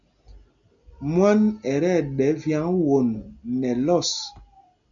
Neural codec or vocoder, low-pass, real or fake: none; 7.2 kHz; real